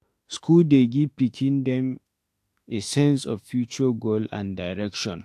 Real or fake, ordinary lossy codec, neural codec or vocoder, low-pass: fake; AAC, 64 kbps; autoencoder, 48 kHz, 32 numbers a frame, DAC-VAE, trained on Japanese speech; 14.4 kHz